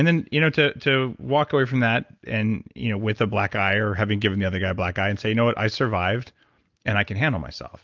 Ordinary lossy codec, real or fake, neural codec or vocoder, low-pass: Opus, 24 kbps; real; none; 7.2 kHz